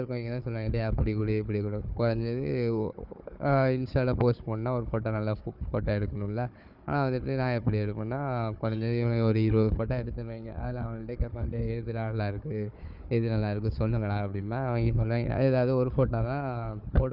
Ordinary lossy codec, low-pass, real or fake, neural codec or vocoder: Opus, 64 kbps; 5.4 kHz; fake; codec, 16 kHz, 4 kbps, FunCodec, trained on Chinese and English, 50 frames a second